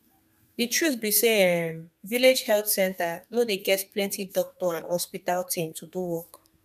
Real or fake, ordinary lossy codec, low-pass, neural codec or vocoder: fake; none; 14.4 kHz; codec, 32 kHz, 1.9 kbps, SNAC